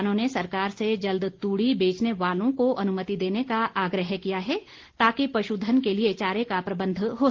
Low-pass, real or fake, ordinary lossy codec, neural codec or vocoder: 7.2 kHz; real; Opus, 16 kbps; none